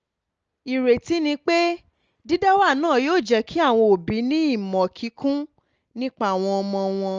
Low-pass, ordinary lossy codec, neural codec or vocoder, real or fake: 7.2 kHz; Opus, 24 kbps; none; real